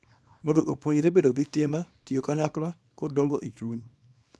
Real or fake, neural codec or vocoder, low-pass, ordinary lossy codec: fake; codec, 24 kHz, 0.9 kbps, WavTokenizer, small release; none; none